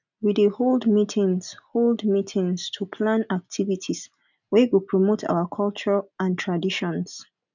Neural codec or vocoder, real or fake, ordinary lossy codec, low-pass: none; real; none; 7.2 kHz